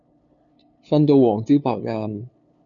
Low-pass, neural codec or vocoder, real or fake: 7.2 kHz; codec, 16 kHz, 2 kbps, FunCodec, trained on LibriTTS, 25 frames a second; fake